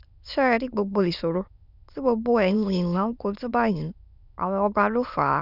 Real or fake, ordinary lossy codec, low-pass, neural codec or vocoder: fake; none; 5.4 kHz; autoencoder, 22.05 kHz, a latent of 192 numbers a frame, VITS, trained on many speakers